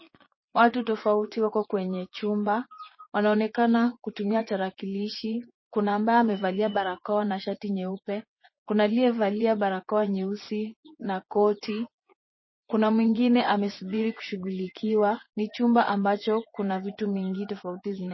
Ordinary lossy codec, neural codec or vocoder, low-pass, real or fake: MP3, 24 kbps; vocoder, 24 kHz, 100 mel bands, Vocos; 7.2 kHz; fake